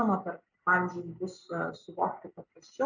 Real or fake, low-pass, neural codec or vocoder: real; 7.2 kHz; none